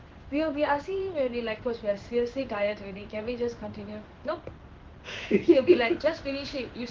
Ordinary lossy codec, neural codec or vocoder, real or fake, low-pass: Opus, 16 kbps; codec, 16 kHz in and 24 kHz out, 1 kbps, XY-Tokenizer; fake; 7.2 kHz